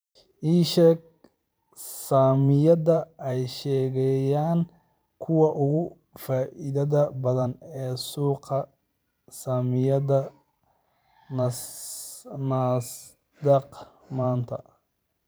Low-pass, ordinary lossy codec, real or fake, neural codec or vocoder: none; none; fake; vocoder, 44.1 kHz, 128 mel bands every 512 samples, BigVGAN v2